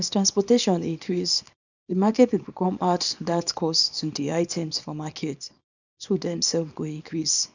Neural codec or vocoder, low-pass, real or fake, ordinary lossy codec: codec, 24 kHz, 0.9 kbps, WavTokenizer, small release; 7.2 kHz; fake; none